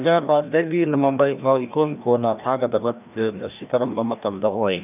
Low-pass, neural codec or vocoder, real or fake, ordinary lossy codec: 3.6 kHz; codec, 16 kHz, 1 kbps, FreqCodec, larger model; fake; none